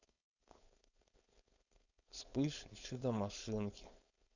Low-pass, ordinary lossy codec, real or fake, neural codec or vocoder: 7.2 kHz; none; fake; codec, 16 kHz, 4.8 kbps, FACodec